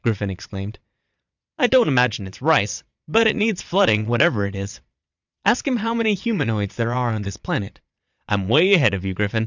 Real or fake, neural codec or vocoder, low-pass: fake; vocoder, 22.05 kHz, 80 mel bands, Vocos; 7.2 kHz